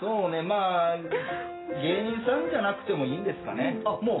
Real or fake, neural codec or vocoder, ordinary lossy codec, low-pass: real; none; AAC, 16 kbps; 7.2 kHz